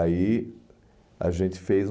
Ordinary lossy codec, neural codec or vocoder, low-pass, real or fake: none; none; none; real